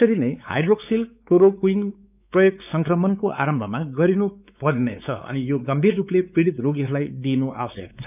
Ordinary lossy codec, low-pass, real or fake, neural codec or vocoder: none; 3.6 kHz; fake; codec, 16 kHz, 4 kbps, X-Codec, WavLM features, trained on Multilingual LibriSpeech